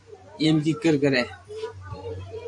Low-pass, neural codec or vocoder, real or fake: 10.8 kHz; vocoder, 44.1 kHz, 128 mel bands every 512 samples, BigVGAN v2; fake